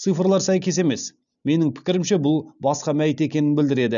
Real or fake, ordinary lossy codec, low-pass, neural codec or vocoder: real; none; 7.2 kHz; none